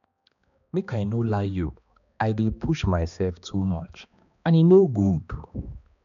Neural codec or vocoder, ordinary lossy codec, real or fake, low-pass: codec, 16 kHz, 2 kbps, X-Codec, HuBERT features, trained on balanced general audio; none; fake; 7.2 kHz